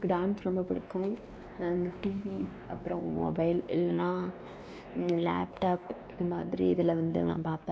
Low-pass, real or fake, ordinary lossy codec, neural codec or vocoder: none; fake; none; codec, 16 kHz, 2 kbps, X-Codec, WavLM features, trained on Multilingual LibriSpeech